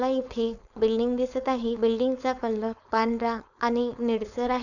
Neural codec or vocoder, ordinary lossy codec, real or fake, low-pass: codec, 16 kHz, 4.8 kbps, FACodec; none; fake; 7.2 kHz